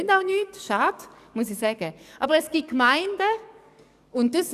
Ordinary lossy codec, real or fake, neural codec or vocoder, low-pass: none; fake; codec, 44.1 kHz, 7.8 kbps, DAC; 14.4 kHz